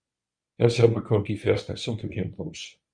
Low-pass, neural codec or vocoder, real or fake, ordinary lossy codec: 9.9 kHz; codec, 24 kHz, 0.9 kbps, WavTokenizer, medium speech release version 1; fake; Opus, 64 kbps